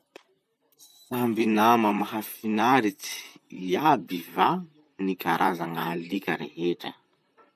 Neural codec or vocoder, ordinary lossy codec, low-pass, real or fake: vocoder, 44.1 kHz, 128 mel bands, Pupu-Vocoder; none; 14.4 kHz; fake